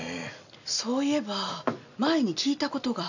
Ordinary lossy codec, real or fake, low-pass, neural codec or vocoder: none; real; 7.2 kHz; none